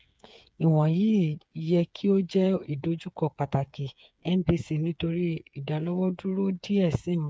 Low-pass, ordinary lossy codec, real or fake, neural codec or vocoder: none; none; fake; codec, 16 kHz, 8 kbps, FreqCodec, smaller model